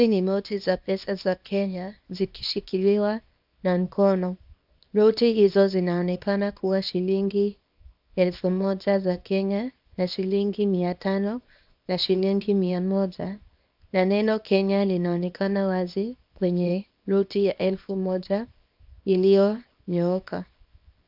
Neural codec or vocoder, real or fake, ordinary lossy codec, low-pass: codec, 24 kHz, 0.9 kbps, WavTokenizer, small release; fake; AAC, 48 kbps; 5.4 kHz